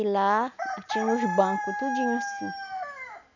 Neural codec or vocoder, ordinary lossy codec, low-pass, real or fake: none; none; 7.2 kHz; real